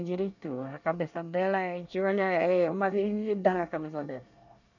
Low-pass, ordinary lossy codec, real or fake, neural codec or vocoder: 7.2 kHz; none; fake; codec, 24 kHz, 1 kbps, SNAC